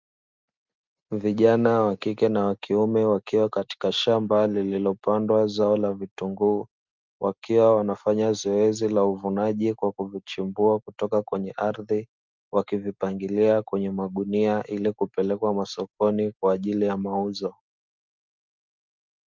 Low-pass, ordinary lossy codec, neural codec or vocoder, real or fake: 7.2 kHz; Opus, 32 kbps; none; real